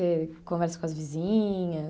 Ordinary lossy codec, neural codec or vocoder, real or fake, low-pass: none; none; real; none